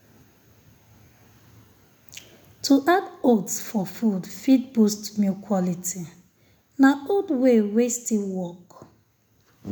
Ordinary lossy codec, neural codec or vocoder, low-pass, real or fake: none; none; none; real